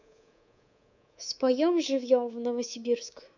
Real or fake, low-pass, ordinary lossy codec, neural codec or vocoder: fake; 7.2 kHz; none; codec, 24 kHz, 3.1 kbps, DualCodec